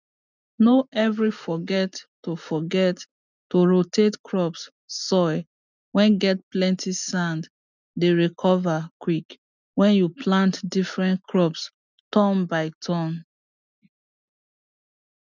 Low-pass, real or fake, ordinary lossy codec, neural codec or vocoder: 7.2 kHz; real; none; none